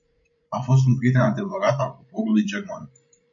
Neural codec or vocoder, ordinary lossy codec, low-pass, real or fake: codec, 16 kHz, 16 kbps, FreqCodec, larger model; MP3, 96 kbps; 7.2 kHz; fake